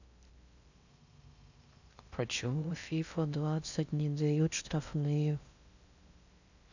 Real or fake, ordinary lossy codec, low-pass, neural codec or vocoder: fake; none; 7.2 kHz; codec, 16 kHz in and 24 kHz out, 0.6 kbps, FocalCodec, streaming, 2048 codes